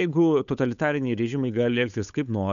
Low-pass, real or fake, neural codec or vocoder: 7.2 kHz; fake; codec, 16 kHz, 8 kbps, FunCodec, trained on Chinese and English, 25 frames a second